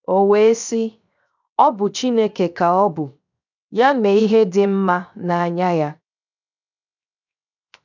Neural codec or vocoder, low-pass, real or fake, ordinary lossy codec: codec, 16 kHz, 0.7 kbps, FocalCodec; 7.2 kHz; fake; none